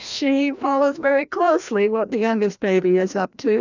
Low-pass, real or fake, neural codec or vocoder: 7.2 kHz; fake; codec, 16 kHz, 1 kbps, FreqCodec, larger model